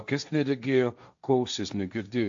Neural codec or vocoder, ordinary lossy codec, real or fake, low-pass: codec, 16 kHz, 1.1 kbps, Voila-Tokenizer; AAC, 64 kbps; fake; 7.2 kHz